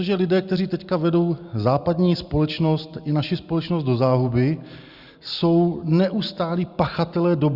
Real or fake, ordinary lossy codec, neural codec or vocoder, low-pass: real; Opus, 64 kbps; none; 5.4 kHz